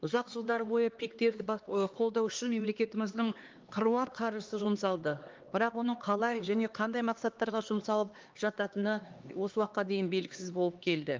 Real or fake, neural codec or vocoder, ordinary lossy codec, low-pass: fake; codec, 16 kHz, 2 kbps, X-Codec, HuBERT features, trained on LibriSpeech; Opus, 32 kbps; 7.2 kHz